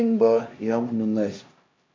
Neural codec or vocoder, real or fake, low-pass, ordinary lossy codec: codec, 16 kHz, 1 kbps, X-Codec, HuBERT features, trained on LibriSpeech; fake; 7.2 kHz; MP3, 48 kbps